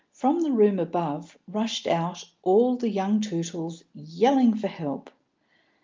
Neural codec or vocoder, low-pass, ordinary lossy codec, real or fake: none; 7.2 kHz; Opus, 24 kbps; real